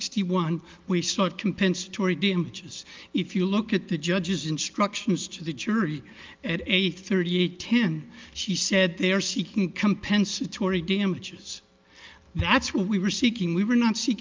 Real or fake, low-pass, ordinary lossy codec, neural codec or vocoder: real; 7.2 kHz; Opus, 24 kbps; none